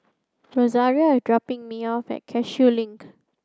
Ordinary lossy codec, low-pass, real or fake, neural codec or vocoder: none; none; real; none